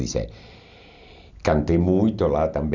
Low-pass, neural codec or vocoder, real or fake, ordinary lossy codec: 7.2 kHz; none; real; none